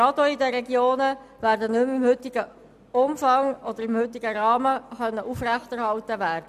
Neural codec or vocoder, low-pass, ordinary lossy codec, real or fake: none; 14.4 kHz; none; real